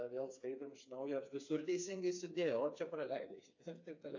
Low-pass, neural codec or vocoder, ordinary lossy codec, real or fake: 7.2 kHz; codec, 16 kHz, 4 kbps, FreqCodec, smaller model; AAC, 48 kbps; fake